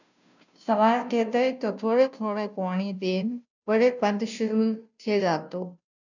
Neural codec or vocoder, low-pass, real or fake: codec, 16 kHz, 0.5 kbps, FunCodec, trained on Chinese and English, 25 frames a second; 7.2 kHz; fake